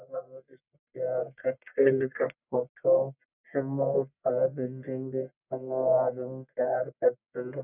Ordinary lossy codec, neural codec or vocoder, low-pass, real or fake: none; codec, 44.1 kHz, 1.7 kbps, Pupu-Codec; 3.6 kHz; fake